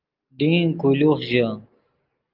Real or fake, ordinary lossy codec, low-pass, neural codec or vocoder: real; Opus, 32 kbps; 5.4 kHz; none